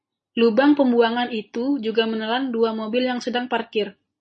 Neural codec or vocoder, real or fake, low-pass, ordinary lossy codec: none; real; 10.8 kHz; MP3, 32 kbps